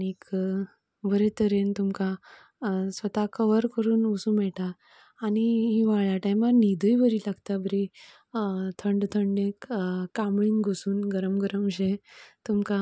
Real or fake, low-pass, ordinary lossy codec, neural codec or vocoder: real; none; none; none